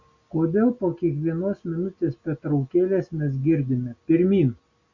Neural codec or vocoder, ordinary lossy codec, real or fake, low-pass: none; Opus, 64 kbps; real; 7.2 kHz